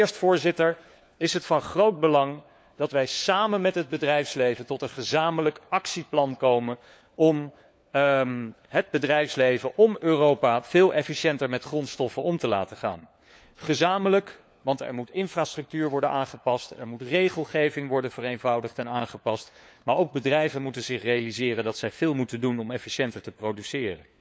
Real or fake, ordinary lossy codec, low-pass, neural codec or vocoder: fake; none; none; codec, 16 kHz, 4 kbps, FunCodec, trained on LibriTTS, 50 frames a second